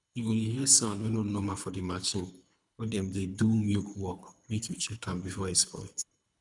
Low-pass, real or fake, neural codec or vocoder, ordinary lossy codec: 10.8 kHz; fake; codec, 24 kHz, 3 kbps, HILCodec; none